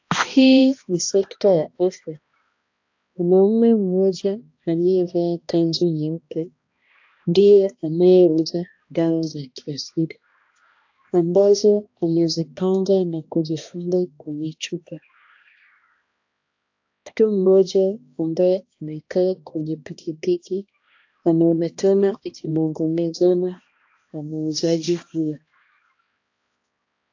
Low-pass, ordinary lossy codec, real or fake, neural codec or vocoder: 7.2 kHz; AAC, 48 kbps; fake; codec, 16 kHz, 1 kbps, X-Codec, HuBERT features, trained on balanced general audio